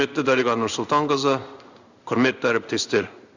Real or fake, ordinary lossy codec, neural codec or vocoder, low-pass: fake; Opus, 64 kbps; codec, 16 kHz in and 24 kHz out, 1 kbps, XY-Tokenizer; 7.2 kHz